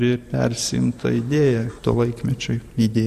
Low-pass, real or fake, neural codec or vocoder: 14.4 kHz; fake; vocoder, 44.1 kHz, 128 mel bands every 512 samples, BigVGAN v2